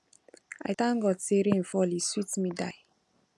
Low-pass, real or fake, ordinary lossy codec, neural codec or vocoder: none; real; none; none